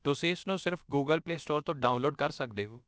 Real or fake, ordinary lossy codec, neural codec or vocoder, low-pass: fake; none; codec, 16 kHz, about 1 kbps, DyCAST, with the encoder's durations; none